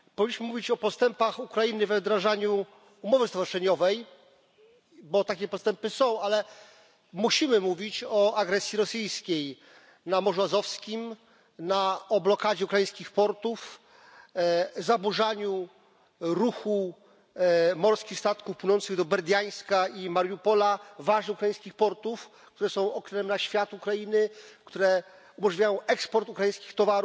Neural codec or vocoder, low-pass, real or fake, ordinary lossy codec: none; none; real; none